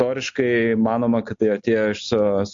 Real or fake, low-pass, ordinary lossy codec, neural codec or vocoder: real; 7.2 kHz; MP3, 48 kbps; none